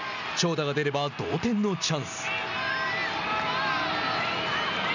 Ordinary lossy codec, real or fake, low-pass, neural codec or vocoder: none; real; 7.2 kHz; none